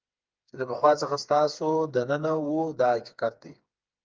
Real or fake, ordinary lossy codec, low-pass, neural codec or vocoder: fake; Opus, 24 kbps; 7.2 kHz; codec, 16 kHz, 4 kbps, FreqCodec, smaller model